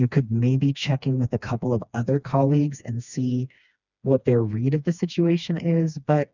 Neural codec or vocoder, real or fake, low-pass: codec, 16 kHz, 2 kbps, FreqCodec, smaller model; fake; 7.2 kHz